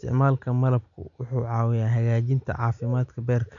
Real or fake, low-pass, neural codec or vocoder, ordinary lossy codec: real; 7.2 kHz; none; none